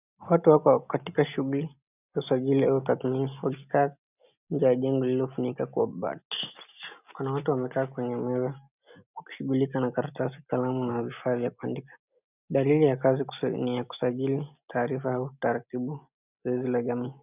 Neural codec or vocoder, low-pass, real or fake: none; 3.6 kHz; real